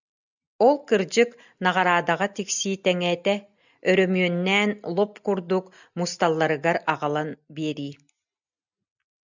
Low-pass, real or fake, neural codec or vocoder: 7.2 kHz; real; none